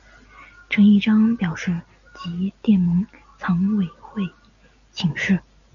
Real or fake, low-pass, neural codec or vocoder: real; 7.2 kHz; none